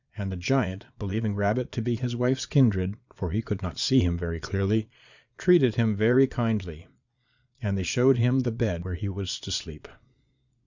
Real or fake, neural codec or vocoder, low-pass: fake; vocoder, 22.05 kHz, 80 mel bands, Vocos; 7.2 kHz